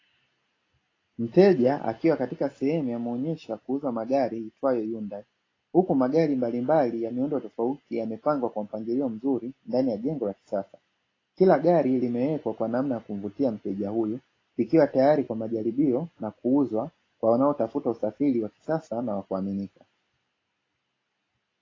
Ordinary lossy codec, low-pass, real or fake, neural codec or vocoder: AAC, 32 kbps; 7.2 kHz; real; none